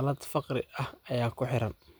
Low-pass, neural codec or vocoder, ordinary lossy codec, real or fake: none; none; none; real